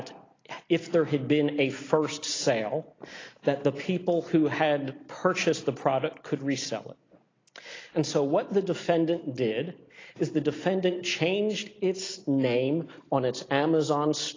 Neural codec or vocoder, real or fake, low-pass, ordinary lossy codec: none; real; 7.2 kHz; AAC, 32 kbps